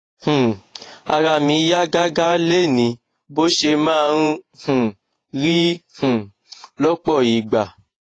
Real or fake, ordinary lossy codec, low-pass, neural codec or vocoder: fake; AAC, 32 kbps; 9.9 kHz; vocoder, 48 kHz, 128 mel bands, Vocos